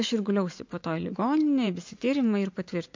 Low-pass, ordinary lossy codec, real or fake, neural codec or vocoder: 7.2 kHz; MP3, 48 kbps; fake; vocoder, 44.1 kHz, 80 mel bands, Vocos